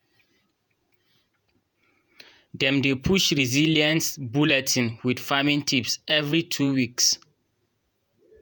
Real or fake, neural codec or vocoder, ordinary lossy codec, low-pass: fake; vocoder, 48 kHz, 128 mel bands, Vocos; none; none